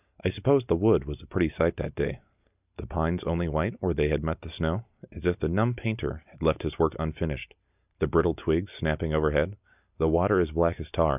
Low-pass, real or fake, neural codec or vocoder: 3.6 kHz; real; none